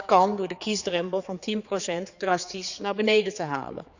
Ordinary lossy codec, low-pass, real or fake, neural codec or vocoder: none; 7.2 kHz; fake; codec, 16 kHz, 4 kbps, X-Codec, HuBERT features, trained on general audio